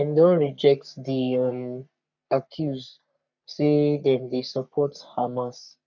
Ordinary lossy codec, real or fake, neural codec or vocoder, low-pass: none; fake; codec, 44.1 kHz, 3.4 kbps, Pupu-Codec; 7.2 kHz